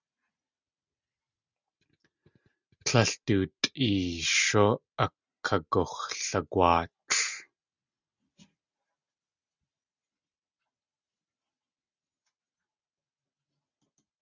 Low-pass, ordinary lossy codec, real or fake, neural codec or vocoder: 7.2 kHz; Opus, 64 kbps; real; none